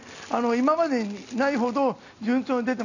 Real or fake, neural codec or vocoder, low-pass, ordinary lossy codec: real; none; 7.2 kHz; none